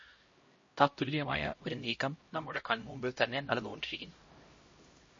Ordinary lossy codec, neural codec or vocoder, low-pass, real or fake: MP3, 32 kbps; codec, 16 kHz, 0.5 kbps, X-Codec, HuBERT features, trained on LibriSpeech; 7.2 kHz; fake